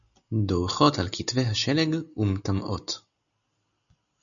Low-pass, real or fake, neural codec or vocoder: 7.2 kHz; real; none